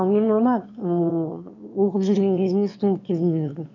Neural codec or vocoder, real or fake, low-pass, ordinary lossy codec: autoencoder, 22.05 kHz, a latent of 192 numbers a frame, VITS, trained on one speaker; fake; 7.2 kHz; none